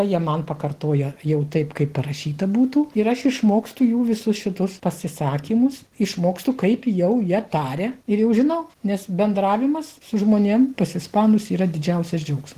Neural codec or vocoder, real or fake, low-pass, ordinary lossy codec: none; real; 14.4 kHz; Opus, 16 kbps